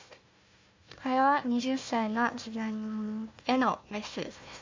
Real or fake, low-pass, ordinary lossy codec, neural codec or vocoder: fake; 7.2 kHz; MP3, 64 kbps; codec, 16 kHz, 1 kbps, FunCodec, trained on Chinese and English, 50 frames a second